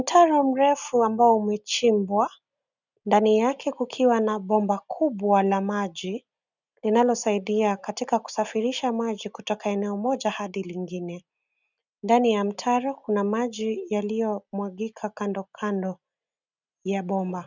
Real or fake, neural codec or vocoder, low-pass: real; none; 7.2 kHz